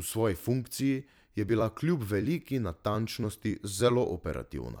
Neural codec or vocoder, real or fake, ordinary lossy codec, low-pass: vocoder, 44.1 kHz, 128 mel bands every 256 samples, BigVGAN v2; fake; none; none